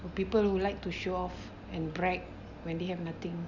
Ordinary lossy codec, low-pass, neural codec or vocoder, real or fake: none; 7.2 kHz; none; real